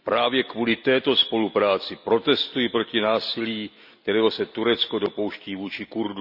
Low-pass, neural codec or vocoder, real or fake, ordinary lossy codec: 5.4 kHz; none; real; none